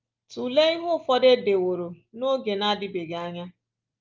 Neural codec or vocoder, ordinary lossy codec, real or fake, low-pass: none; Opus, 32 kbps; real; 7.2 kHz